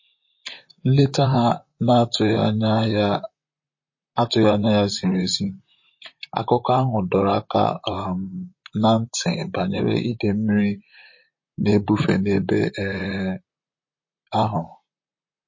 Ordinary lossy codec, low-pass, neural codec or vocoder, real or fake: MP3, 32 kbps; 7.2 kHz; vocoder, 44.1 kHz, 128 mel bands, Pupu-Vocoder; fake